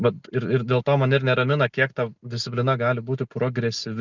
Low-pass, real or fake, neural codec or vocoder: 7.2 kHz; real; none